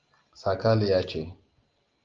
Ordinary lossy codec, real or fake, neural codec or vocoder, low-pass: Opus, 24 kbps; real; none; 7.2 kHz